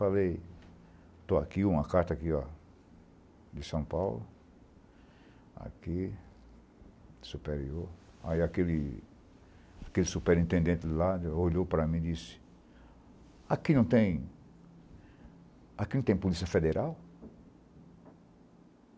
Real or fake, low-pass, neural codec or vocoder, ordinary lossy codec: real; none; none; none